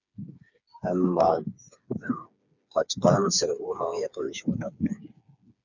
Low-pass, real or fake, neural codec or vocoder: 7.2 kHz; fake; codec, 16 kHz, 4 kbps, FreqCodec, smaller model